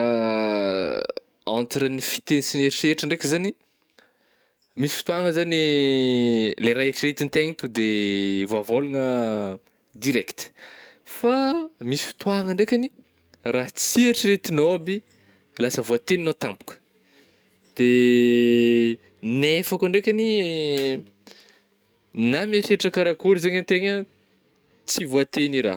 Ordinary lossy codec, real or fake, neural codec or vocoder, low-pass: none; fake; codec, 44.1 kHz, 7.8 kbps, DAC; none